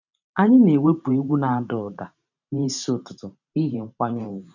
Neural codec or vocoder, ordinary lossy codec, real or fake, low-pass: vocoder, 44.1 kHz, 128 mel bands every 512 samples, BigVGAN v2; none; fake; 7.2 kHz